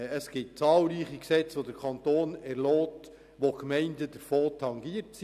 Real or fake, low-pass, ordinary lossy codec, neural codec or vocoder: real; 14.4 kHz; none; none